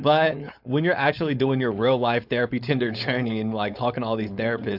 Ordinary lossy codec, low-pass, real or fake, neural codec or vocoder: AAC, 48 kbps; 5.4 kHz; fake; codec, 16 kHz, 4.8 kbps, FACodec